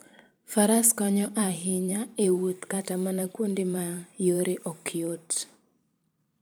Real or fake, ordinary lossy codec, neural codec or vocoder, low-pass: fake; none; vocoder, 44.1 kHz, 128 mel bands every 512 samples, BigVGAN v2; none